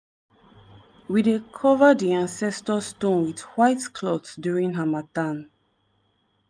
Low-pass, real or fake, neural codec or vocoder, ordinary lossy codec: none; real; none; none